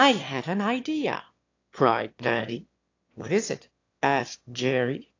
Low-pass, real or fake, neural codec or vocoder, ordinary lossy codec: 7.2 kHz; fake; autoencoder, 22.05 kHz, a latent of 192 numbers a frame, VITS, trained on one speaker; AAC, 48 kbps